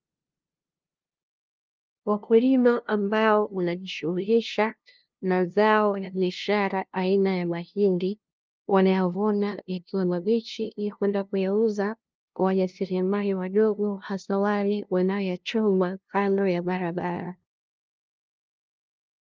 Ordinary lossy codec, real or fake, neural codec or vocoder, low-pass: Opus, 32 kbps; fake; codec, 16 kHz, 0.5 kbps, FunCodec, trained on LibriTTS, 25 frames a second; 7.2 kHz